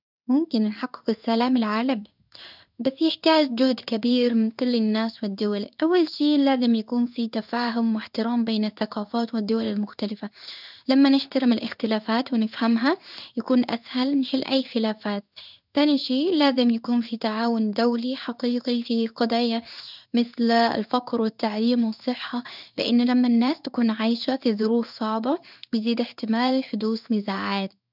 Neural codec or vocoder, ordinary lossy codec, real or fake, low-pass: codec, 16 kHz in and 24 kHz out, 1 kbps, XY-Tokenizer; none; fake; 5.4 kHz